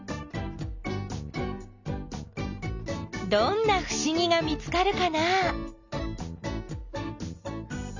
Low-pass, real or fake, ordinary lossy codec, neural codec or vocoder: 7.2 kHz; real; none; none